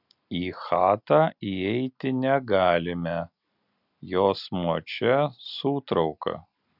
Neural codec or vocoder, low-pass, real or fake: none; 5.4 kHz; real